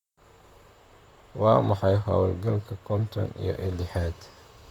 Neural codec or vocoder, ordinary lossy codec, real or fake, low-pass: vocoder, 44.1 kHz, 128 mel bands, Pupu-Vocoder; Opus, 64 kbps; fake; 19.8 kHz